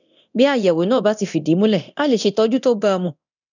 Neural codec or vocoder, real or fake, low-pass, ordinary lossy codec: codec, 24 kHz, 0.9 kbps, DualCodec; fake; 7.2 kHz; none